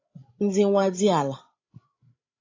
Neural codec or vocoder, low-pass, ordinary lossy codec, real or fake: codec, 16 kHz, 16 kbps, FreqCodec, larger model; 7.2 kHz; AAC, 32 kbps; fake